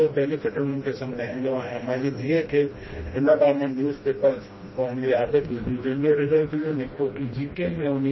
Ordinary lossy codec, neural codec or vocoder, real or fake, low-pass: MP3, 24 kbps; codec, 16 kHz, 1 kbps, FreqCodec, smaller model; fake; 7.2 kHz